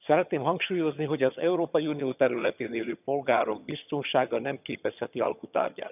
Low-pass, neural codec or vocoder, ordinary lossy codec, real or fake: 3.6 kHz; vocoder, 22.05 kHz, 80 mel bands, HiFi-GAN; none; fake